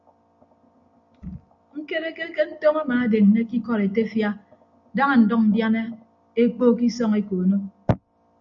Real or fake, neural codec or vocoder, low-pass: real; none; 7.2 kHz